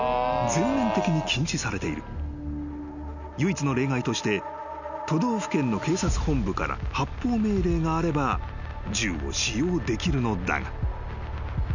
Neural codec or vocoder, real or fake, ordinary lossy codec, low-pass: none; real; none; 7.2 kHz